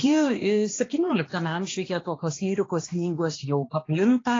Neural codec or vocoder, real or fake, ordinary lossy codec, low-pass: codec, 16 kHz, 2 kbps, X-Codec, HuBERT features, trained on balanced general audio; fake; AAC, 32 kbps; 7.2 kHz